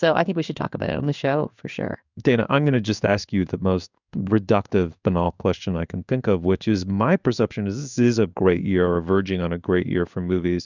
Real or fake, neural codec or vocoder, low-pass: fake; codec, 16 kHz in and 24 kHz out, 1 kbps, XY-Tokenizer; 7.2 kHz